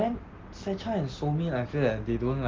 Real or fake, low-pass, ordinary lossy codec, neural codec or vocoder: real; 7.2 kHz; Opus, 16 kbps; none